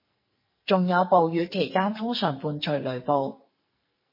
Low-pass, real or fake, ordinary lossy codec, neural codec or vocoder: 5.4 kHz; fake; MP3, 24 kbps; codec, 44.1 kHz, 2.6 kbps, SNAC